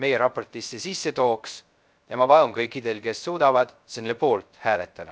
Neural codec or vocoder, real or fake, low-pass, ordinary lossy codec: codec, 16 kHz, 0.3 kbps, FocalCodec; fake; none; none